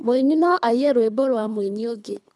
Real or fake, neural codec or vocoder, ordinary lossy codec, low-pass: fake; codec, 24 kHz, 3 kbps, HILCodec; none; none